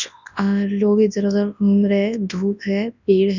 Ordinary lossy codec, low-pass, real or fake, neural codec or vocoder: none; 7.2 kHz; fake; codec, 24 kHz, 0.9 kbps, WavTokenizer, large speech release